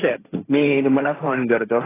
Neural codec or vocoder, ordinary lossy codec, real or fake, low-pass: codec, 16 kHz, 1.1 kbps, Voila-Tokenizer; AAC, 16 kbps; fake; 3.6 kHz